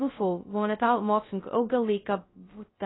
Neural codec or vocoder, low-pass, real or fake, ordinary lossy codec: codec, 16 kHz, 0.2 kbps, FocalCodec; 7.2 kHz; fake; AAC, 16 kbps